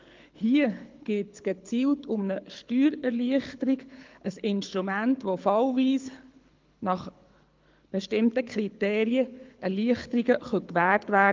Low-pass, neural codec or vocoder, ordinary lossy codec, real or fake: 7.2 kHz; codec, 24 kHz, 6 kbps, HILCodec; Opus, 32 kbps; fake